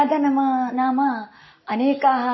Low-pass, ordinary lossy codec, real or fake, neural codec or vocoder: 7.2 kHz; MP3, 24 kbps; real; none